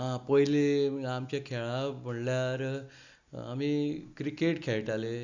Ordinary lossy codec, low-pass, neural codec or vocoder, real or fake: Opus, 64 kbps; 7.2 kHz; none; real